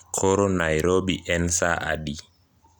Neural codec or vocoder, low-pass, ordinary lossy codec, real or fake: none; none; none; real